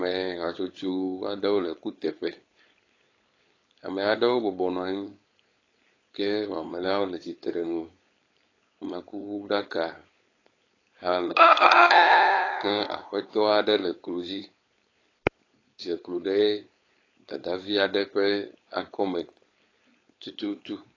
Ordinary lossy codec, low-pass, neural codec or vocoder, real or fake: AAC, 32 kbps; 7.2 kHz; codec, 16 kHz, 4.8 kbps, FACodec; fake